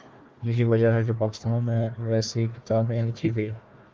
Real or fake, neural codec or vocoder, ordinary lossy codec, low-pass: fake; codec, 16 kHz, 1 kbps, FunCodec, trained on Chinese and English, 50 frames a second; Opus, 24 kbps; 7.2 kHz